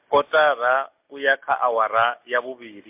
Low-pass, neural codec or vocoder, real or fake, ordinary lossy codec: 3.6 kHz; none; real; MP3, 32 kbps